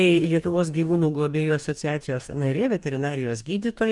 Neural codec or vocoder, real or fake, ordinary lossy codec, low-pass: codec, 44.1 kHz, 2.6 kbps, DAC; fake; MP3, 96 kbps; 10.8 kHz